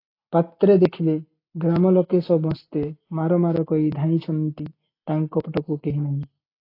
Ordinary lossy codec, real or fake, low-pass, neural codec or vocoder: AAC, 32 kbps; real; 5.4 kHz; none